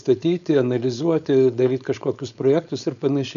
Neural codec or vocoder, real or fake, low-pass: codec, 16 kHz, 4.8 kbps, FACodec; fake; 7.2 kHz